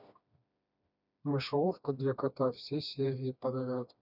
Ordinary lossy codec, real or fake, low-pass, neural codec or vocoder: none; fake; 5.4 kHz; codec, 16 kHz, 2 kbps, FreqCodec, smaller model